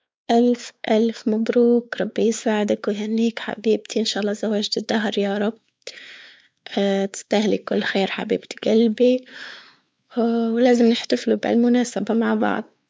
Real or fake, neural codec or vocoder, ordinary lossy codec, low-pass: fake; codec, 16 kHz, 4 kbps, X-Codec, WavLM features, trained on Multilingual LibriSpeech; none; none